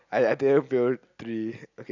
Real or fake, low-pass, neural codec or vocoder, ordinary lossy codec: real; 7.2 kHz; none; none